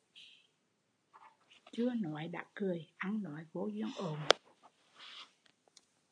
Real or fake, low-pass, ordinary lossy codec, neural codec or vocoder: real; 9.9 kHz; AAC, 48 kbps; none